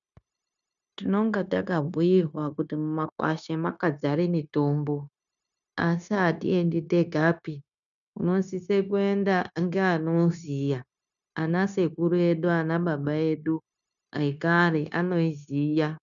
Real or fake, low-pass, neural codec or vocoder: fake; 7.2 kHz; codec, 16 kHz, 0.9 kbps, LongCat-Audio-Codec